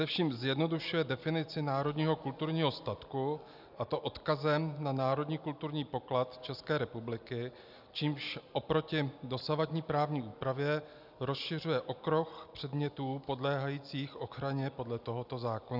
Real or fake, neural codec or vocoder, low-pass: real; none; 5.4 kHz